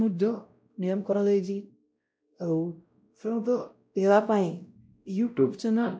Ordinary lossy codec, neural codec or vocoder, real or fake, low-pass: none; codec, 16 kHz, 0.5 kbps, X-Codec, WavLM features, trained on Multilingual LibriSpeech; fake; none